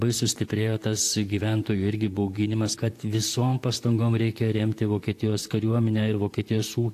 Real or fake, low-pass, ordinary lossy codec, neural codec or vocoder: fake; 14.4 kHz; AAC, 64 kbps; vocoder, 44.1 kHz, 128 mel bands, Pupu-Vocoder